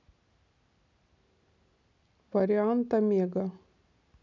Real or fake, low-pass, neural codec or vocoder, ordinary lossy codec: real; 7.2 kHz; none; none